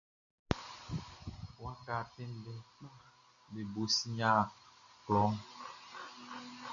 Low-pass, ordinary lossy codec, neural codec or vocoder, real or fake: 7.2 kHz; Opus, 64 kbps; none; real